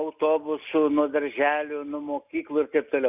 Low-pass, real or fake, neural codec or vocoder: 3.6 kHz; real; none